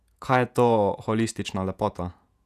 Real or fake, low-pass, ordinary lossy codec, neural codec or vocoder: fake; 14.4 kHz; none; vocoder, 48 kHz, 128 mel bands, Vocos